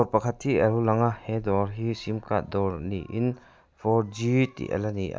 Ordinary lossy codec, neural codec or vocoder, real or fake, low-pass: none; none; real; none